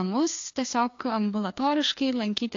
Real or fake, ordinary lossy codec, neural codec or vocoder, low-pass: fake; AAC, 48 kbps; codec, 16 kHz, 2 kbps, FreqCodec, larger model; 7.2 kHz